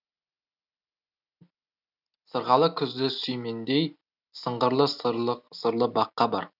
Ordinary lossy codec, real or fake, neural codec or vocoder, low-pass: none; real; none; 5.4 kHz